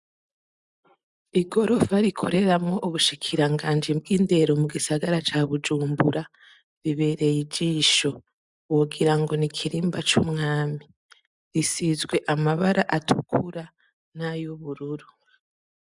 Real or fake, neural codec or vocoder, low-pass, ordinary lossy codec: real; none; 10.8 kHz; MP3, 96 kbps